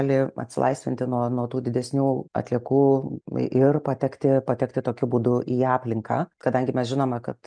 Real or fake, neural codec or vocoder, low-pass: real; none; 9.9 kHz